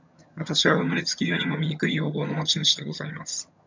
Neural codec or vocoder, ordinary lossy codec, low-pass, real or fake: vocoder, 22.05 kHz, 80 mel bands, HiFi-GAN; MP3, 64 kbps; 7.2 kHz; fake